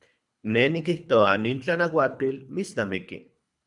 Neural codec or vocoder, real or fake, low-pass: codec, 24 kHz, 3 kbps, HILCodec; fake; 10.8 kHz